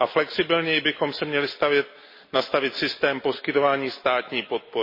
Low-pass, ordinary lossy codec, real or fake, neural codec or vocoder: 5.4 kHz; MP3, 24 kbps; real; none